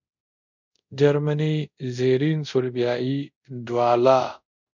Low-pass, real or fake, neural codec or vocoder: 7.2 kHz; fake; codec, 24 kHz, 0.5 kbps, DualCodec